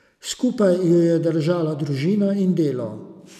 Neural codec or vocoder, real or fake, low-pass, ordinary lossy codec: none; real; 14.4 kHz; none